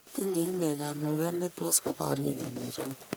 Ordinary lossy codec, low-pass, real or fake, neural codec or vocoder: none; none; fake; codec, 44.1 kHz, 1.7 kbps, Pupu-Codec